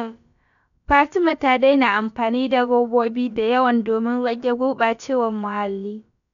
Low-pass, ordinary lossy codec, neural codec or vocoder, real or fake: 7.2 kHz; none; codec, 16 kHz, about 1 kbps, DyCAST, with the encoder's durations; fake